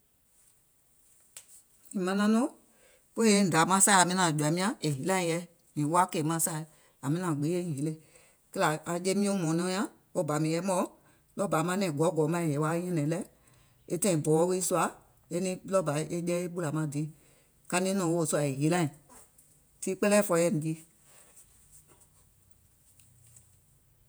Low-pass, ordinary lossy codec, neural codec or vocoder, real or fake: none; none; vocoder, 48 kHz, 128 mel bands, Vocos; fake